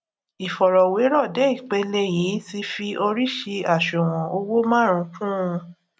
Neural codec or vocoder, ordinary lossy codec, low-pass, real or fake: none; none; none; real